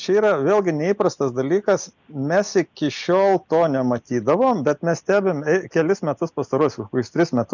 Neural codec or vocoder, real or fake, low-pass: none; real; 7.2 kHz